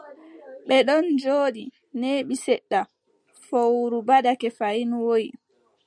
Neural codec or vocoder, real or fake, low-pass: none; real; 9.9 kHz